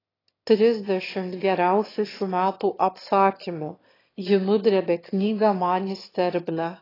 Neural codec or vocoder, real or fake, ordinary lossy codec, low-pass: autoencoder, 22.05 kHz, a latent of 192 numbers a frame, VITS, trained on one speaker; fake; AAC, 24 kbps; 5.4 kHz